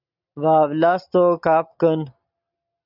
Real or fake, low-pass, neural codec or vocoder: real; 5.4 kHz; none